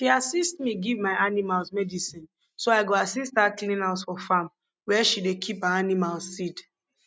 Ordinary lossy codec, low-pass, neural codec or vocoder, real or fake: none; none; none; real